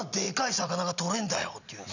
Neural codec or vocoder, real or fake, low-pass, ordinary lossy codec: none; real; 7.2 kHz; none